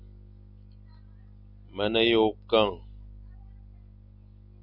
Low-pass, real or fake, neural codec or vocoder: 5.4 kHz; real; none